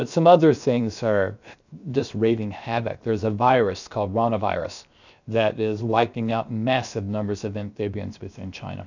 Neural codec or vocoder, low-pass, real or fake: codec, 16 kHz, 0.7 kbps, FocalCodec; 7.2 kHz; fake